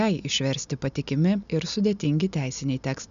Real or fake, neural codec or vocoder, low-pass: real; none; 7.2 kHz